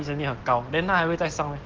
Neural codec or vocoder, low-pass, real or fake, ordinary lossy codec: none; 7.2 kHz; real; Opus, 16 kbps